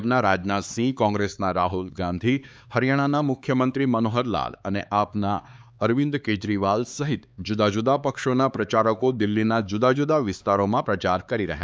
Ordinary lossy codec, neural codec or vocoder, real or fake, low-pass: none; codec, 16 kHz, 4 kbps, X-Codec, HuBERT features, trained on LibriSpeech; fake; none